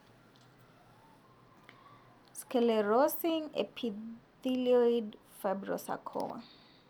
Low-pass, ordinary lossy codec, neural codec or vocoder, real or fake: 19.8 kHz; none; none; real